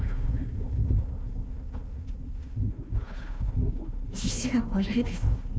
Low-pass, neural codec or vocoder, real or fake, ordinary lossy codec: none; codec, 16 kHz, 1 kbps, FunCodec, trained on Chinese and English, 50 frames a second; fake; none